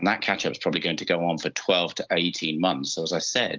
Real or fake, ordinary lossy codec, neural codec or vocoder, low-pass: real; Opus, 32 kbps; none; 7.2 kHz